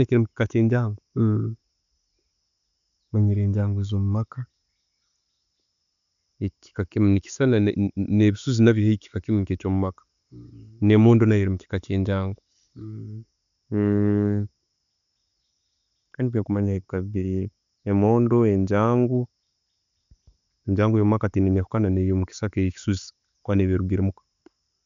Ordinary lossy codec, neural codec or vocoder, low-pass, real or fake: none; none; 7.2 kHz; real